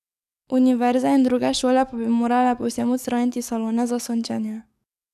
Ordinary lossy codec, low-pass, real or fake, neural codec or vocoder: none; 14.4 kHz; fake; codec, 44.1 kHz, 7.8 kbps, DAC